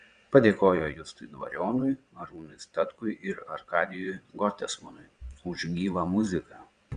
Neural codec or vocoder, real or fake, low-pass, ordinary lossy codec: vocoder, 22.05 kHz, 80 mel bands, WaveNeXt; fake; 9.9 kHz; Opus, 64 kbps